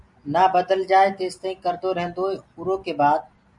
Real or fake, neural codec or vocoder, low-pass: real; none; 10.8 kHz